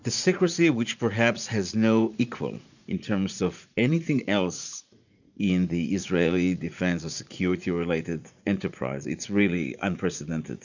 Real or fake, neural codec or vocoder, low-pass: fake; vocoder, 44.1 kHz, 80 mel bands, Vocos; 7.2 kHz